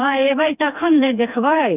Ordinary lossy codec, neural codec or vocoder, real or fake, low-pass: none; codec, 16 kHz, 2 kbps, FreqCodec, smaller model; fake; 3.6 kHz